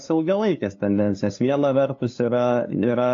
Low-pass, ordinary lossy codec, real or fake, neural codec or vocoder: 7.2 kHz; AAC, 48 kbps; fake; codec, 16 kHz, 2 kbps, FunCodec, trained on LibriTTS, 25 frames a second